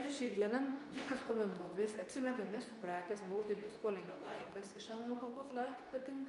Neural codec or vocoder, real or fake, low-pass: codec, 24 kHz, 0.9 kbps, WavTokenizer, medium speech release version 2; fake; 10.8 kHz